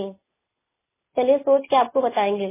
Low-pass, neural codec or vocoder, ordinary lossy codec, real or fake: 3.6 kHz; none; MP3, 16 kbps; real